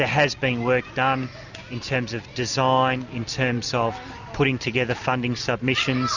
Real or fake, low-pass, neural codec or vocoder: real; 7.2 kHz; none